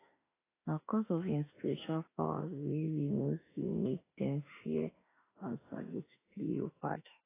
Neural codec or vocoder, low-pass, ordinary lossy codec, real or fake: autoencoder, 48 kHz, 32 numbers a frame, DAC-VAE, trained on Japanese speech; 3.6 kHz; AAC, 16 kbps; fake